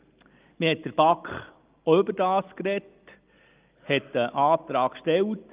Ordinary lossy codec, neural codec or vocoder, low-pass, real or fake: Opus, 32 kbps; none; 3.6 kHz; real